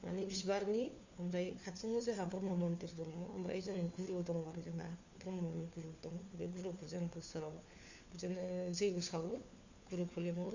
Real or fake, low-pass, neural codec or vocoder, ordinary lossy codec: fake; 7.2 kHz; codec, 16 kHz, 4 kbps, FunCodec, trained on LibriTTS, 50 frames a second; Opus, 64 kbps